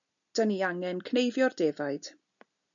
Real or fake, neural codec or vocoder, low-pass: real; none; 7.2 kHz